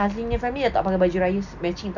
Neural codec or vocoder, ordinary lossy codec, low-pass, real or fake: none; none; 7.2 kHz; real